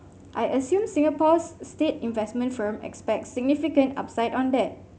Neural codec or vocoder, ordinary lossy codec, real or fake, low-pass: none; none; real; none